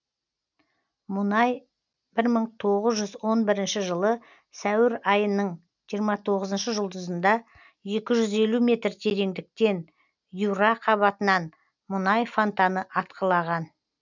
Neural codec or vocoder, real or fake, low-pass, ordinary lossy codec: none; real; 7.2 kHz; none